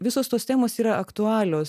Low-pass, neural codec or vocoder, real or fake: 14.4 kHz; none; real